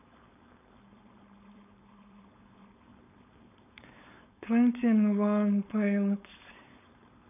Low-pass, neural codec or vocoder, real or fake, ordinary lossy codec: 3.6 kHz; codec, 16 kHz, 4.8 kbps, FACodec; fake; none